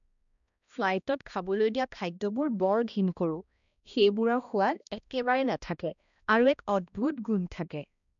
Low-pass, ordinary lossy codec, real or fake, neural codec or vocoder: 7.2 kHz; none; fake; codec, 16 kHz, 1 kbps, X-Codec, HuBERT features, trained on balanced general audio